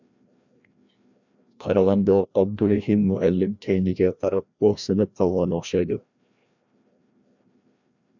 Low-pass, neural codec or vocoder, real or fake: 7.2 kHz; codec, 16 kHz, 1 kbps, FreqCodec, larger model; fake